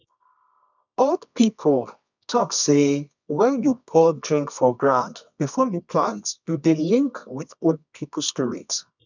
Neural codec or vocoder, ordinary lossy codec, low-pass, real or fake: codec, 24 kHz, 0.9 kbps, WavTokenizer, medium music audio release; none; 7.2 kHz; fake